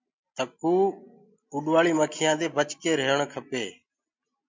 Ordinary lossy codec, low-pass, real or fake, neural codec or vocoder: MP3, 64 kbps; 7.2 kHz; real; none